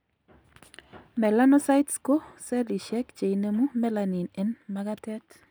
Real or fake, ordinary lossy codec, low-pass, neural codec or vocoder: real; none; none; none